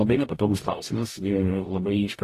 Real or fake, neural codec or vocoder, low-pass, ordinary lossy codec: fake; codec, 44.1 kHz, 0.9 kbps, DAC; 14.4 kHz; AAC, 48 kbps